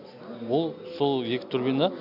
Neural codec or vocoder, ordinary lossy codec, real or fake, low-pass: none; none; real; 5.4 kHz